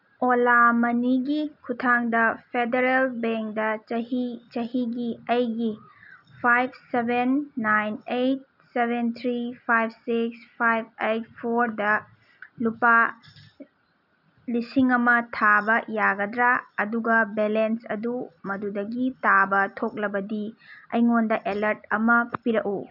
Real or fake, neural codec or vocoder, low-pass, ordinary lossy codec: real; none; 5.4 kHz; none